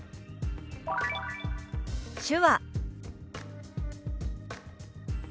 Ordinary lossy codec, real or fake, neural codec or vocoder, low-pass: none; real; none; none